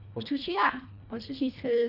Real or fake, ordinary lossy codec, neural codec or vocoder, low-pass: fake; none; codec, 24 kHz, 1.5 kbps, HILCodec; 5.4 kHz